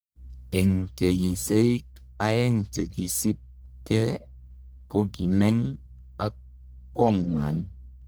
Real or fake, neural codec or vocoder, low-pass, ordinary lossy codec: fake; codec, 44.1 kHz, 1.7 kbps, Pupu-Codec; none; none